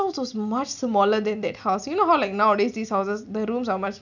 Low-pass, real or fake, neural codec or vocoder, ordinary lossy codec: 7.2 kHz; real; none; none